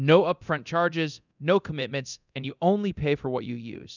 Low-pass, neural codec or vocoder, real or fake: 7.2 kHz; codec, 24 kHz, 0.9 kbps, DualCodec; fake